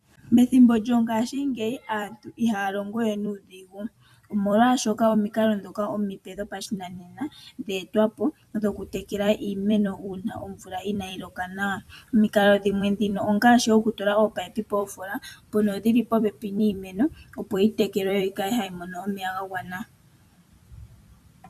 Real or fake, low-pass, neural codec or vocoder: fake; 14.4 kHz; vocoder, 44.1 kHz, 128 mel bands every 256 samples, BigVGAN v2